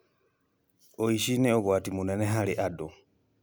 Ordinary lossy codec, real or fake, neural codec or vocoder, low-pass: none; real; none; none